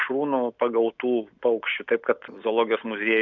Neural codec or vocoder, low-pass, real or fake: none; 7.2 kHz; real